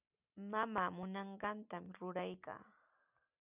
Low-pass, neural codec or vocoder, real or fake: 3.6 kHz; none; real